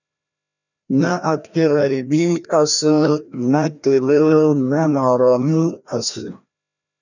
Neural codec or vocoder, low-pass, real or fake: codec, 16 kHz, 1 kbps, FreqCodec, larger model; 7.2 kHz; fake